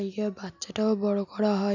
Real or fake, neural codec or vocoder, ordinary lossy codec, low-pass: real; none; none; 7.2 kHz